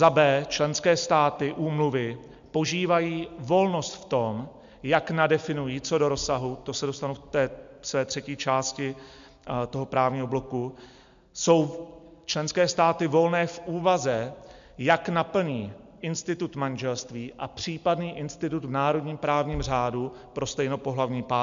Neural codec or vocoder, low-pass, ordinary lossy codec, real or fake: none; 7.2 kHz; MP3, 64 kbps; real